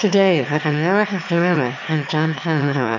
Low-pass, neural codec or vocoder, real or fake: 7.2 kHz; autoencoder, 22.05 kHz, a latent of 192 numbers a frame, VITS, trained on one speaker; fake